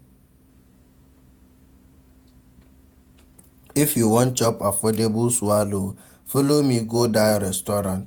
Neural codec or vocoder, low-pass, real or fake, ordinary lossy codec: vocoder, 48 kHz, 128 mel bands, Vocos; none; fake; none